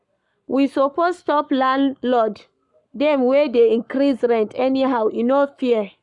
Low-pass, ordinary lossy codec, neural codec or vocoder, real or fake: 10.8 kHz; none; codec, 44.1 kHz, 7.8 kbps, Pupu-Codec; fake